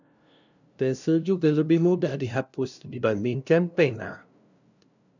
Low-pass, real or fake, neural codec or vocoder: 7.2 kHz; fake; codec, 16 kHz, 0.5 kbps, FunCodec, trained on LibriTTS, 25 frames a second